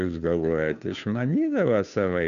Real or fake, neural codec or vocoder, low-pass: fake; codec, 16 kHz, 2 kbps, FunCodec, trained on Chinese and English, 25 frames a second; 7.2 kHz